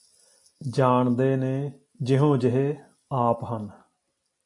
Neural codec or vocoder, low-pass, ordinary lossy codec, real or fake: none; 10.8 kHz; MP3, 48 kbps; real